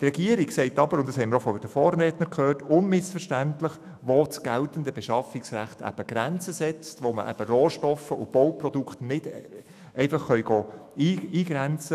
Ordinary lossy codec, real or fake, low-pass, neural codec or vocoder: none; fake; 14.4 kHz; autoencoder, 48 kHz, 128 numbers a frame, DAC-VAE, trained on Japanese speech